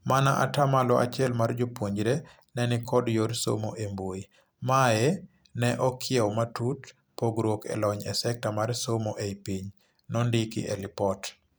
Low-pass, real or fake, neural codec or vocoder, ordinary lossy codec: none; fake; vocoder, 44.1 kHz, 128 mel bands every 256 samples, BigVGAN v2; none